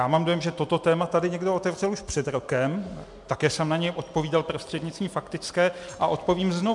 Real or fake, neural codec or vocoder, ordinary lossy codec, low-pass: real; none; MP3, 64 kbps; 10.8 kHz